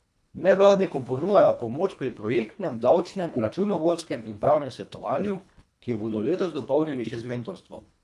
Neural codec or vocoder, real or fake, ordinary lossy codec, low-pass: codec, 24 kHz, 1.5 kbps, HILCodec; fake; none; none